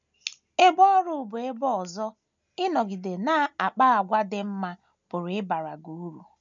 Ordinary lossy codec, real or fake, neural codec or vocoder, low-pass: none; real; none; 7.2 kHz